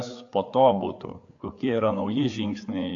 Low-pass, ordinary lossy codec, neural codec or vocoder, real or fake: 7.2 kHz; AAC, 48 kbps; codec, 16 kHz, 4 kbps, FreqCodec, larger model; fake